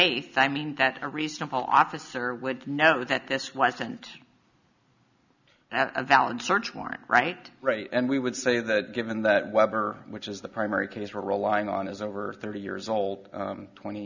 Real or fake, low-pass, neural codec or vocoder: real; 7.2 kHz; none